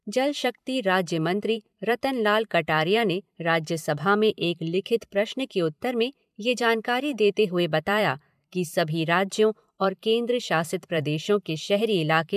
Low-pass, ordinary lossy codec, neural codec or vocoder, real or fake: 14.4 kHz; MP3, 96 kbps; vocoder, 44.1 kHz, 128 mel bands every 512 samples, BigVGAN v2; fake